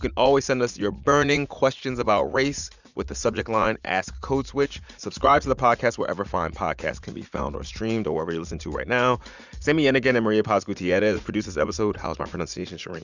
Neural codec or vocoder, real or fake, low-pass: vocoder, 44.1 kHz, 80 mel bands, Vocos; fake; 7.2 kHz